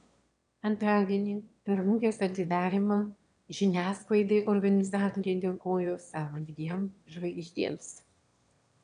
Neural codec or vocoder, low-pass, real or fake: autoencoder, 22.05 kHz, a latent of 192 numbers a frame, VITS, trained on one speaker; 9.9 kHz; fake